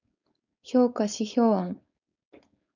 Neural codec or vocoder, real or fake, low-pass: codec, 16 kHz, 4.8 kbps, FACodec; fake; 7.2 kHz